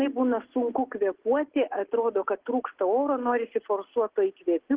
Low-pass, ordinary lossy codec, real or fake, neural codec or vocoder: 3.6 kHz; Opus, 16 kbps; real; none